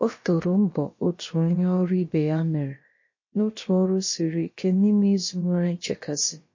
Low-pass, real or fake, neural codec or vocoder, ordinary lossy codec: 7.2 kHz; fake; codec, 16 kHz, about 1 kbps, DyCAST, with the encoder's durations; MP3, 32 kbps